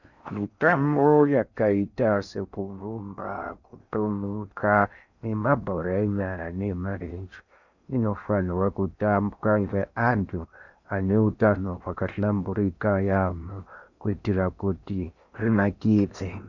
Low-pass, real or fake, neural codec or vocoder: 7.2 kHz; fake; codec, 16 kHz in and 24 kHz out, 0.6 kbps, FocalCodec, streaming, 4096 codes